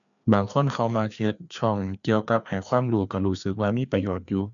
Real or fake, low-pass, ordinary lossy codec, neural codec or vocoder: fake; 7.2 kHz; none; codec, 16 kHz, 2 kbps, FreqCodec, larger model